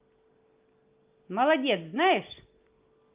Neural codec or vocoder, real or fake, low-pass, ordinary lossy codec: none; real; 3.6 kHz; Opus, 32 kbps